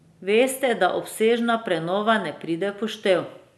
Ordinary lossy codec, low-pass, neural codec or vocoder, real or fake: none; none; none; real